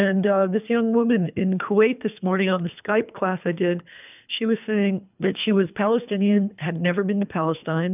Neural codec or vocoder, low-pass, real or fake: codec, 24 kHz, 3 kbps, HILCodec; 3.6 kHz; fake